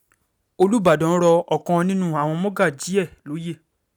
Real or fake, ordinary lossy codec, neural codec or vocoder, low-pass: real; none; none; none